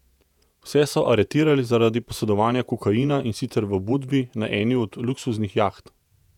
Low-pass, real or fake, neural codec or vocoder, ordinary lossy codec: 19.8 kHz; fake; vocoder, 48 kHz, 128 mel bands, Vocos; none